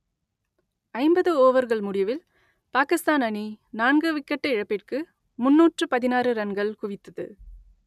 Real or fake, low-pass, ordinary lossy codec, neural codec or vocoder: real; 14.4 kHz; none; none